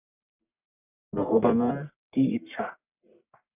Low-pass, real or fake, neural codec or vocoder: 3.6 kHz; fake; codec, 44.1 kHz, 1.7 kbps, Pupu-Codec